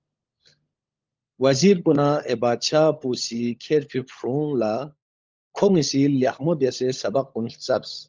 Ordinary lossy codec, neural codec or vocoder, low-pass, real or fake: Opus, 32 kbps; codec, 16 kHz, 16 kbps, FunCodec, trained on LibriTTS, 50 frames a second; 7.2 kHz; fake